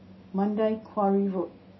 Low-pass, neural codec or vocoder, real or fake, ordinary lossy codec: 7.2 kHz; none; real; MP3, 24 kbps